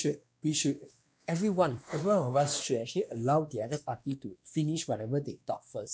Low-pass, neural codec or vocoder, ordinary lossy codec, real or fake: none; codec, 16 kHz, 2 kbps, X-Codec, WavLM features, trained on Multilingual LibriSpeech; none; fake